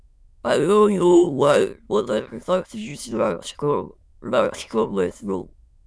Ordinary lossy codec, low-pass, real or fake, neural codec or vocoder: none; none; fake; autoencoder, 22.05 kHz, a latent of 192 numbers a frame, VITS, trained on many speakers